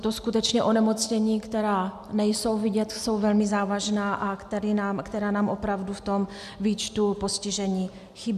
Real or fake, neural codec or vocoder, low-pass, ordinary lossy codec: real; none; 14.4 kHz; MP3, 96 kbps